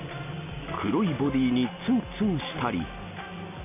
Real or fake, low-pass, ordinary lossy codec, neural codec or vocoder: real; 3.6 kHz; none; none